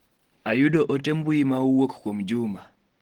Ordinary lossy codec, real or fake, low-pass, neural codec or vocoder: Opus, 16 kbps; real; 19.8 kHz; none